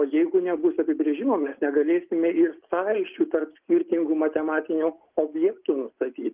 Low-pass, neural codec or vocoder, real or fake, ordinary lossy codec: 3.6 kHz; none; real; Opus, 32 kbps